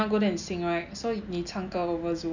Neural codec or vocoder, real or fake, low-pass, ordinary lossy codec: none; real; 7.2 kHz; none